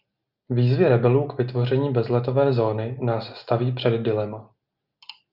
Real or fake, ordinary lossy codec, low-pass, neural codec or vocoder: real; Opus, 64 kbps; 5.4 kHz; none